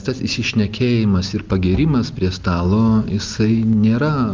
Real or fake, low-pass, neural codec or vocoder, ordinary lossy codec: real; 7.2 kHz; none; Opus, 24 kbps